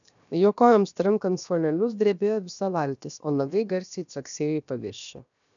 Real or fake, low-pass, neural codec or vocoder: fake; 7.2 kHz; codec, 16 kHz, 0.7 kbps, FocalCodec